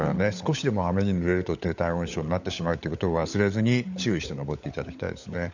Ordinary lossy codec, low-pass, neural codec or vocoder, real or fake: none; 7.2 kHz; codec, 16 kHz, 16 kbps, FunCodec, trained on LibriTTS, 50 frames a second; fake